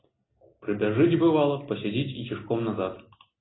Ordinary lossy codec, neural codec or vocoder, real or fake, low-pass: AAC, 16 kbps; none; real; 7.2 kHz